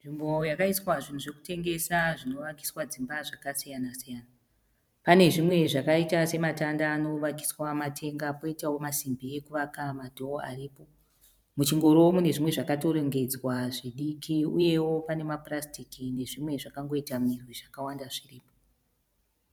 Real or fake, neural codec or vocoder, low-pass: fake; vocoder, 44.1 kHz, 128 mel bands every 512 samples, BigVGAN v2; 19.8 kHz